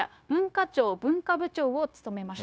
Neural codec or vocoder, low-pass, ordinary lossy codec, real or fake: codec, 16 kHz, 0.9 kbps, LongCat-Audio-Codec; none; none; fake